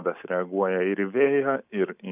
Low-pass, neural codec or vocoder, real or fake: 3.6 kHz; none; real